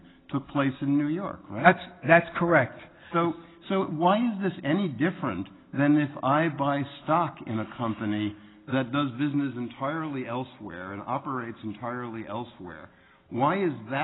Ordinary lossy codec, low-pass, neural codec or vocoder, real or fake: AAC, 16 kbps; 7.2 kHz; none; real